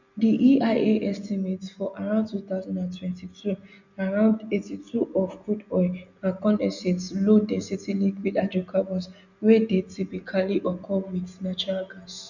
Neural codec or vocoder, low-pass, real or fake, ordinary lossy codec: none; 7.2 kHz; real; none